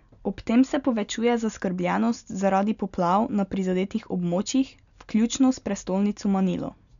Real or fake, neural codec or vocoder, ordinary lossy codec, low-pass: real; none; none; 7.2 kHz